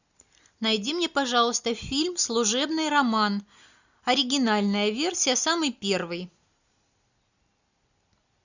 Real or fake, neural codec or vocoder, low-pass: real; none; 7.2 kHz